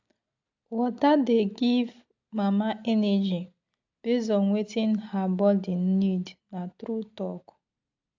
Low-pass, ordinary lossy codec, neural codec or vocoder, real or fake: 7.2 kHz; AAC, 48 kbps; none; real